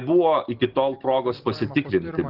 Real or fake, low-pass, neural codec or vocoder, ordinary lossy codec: real; 5.4 kHz; none; Opus, 16 kbps